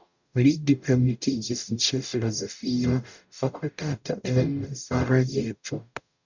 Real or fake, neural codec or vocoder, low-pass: fake; codec, 44.1 kHz, 0.9 kbps, DAC; 7.2 kHz